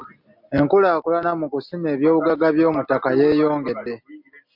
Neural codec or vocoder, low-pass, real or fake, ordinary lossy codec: none; 5.4 kHz; real; MP3, 48 kbps